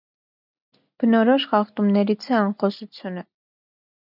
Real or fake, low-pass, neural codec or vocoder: real; 5.4 kHz; none